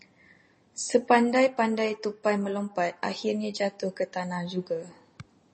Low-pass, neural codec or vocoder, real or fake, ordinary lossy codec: 10.8 kHz; none; real; MP3, 32 kbps